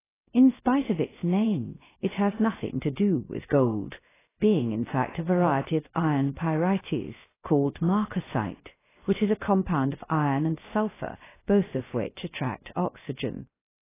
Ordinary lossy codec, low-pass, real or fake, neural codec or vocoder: AAC, 16 kbps; 3.6 kHz; fake; codec, 16 kHz, 0.3 kbps, FocalCodec